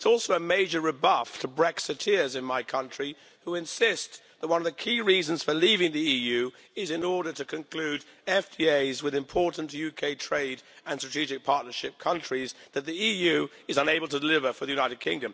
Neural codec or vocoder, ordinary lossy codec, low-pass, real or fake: none; none; none; real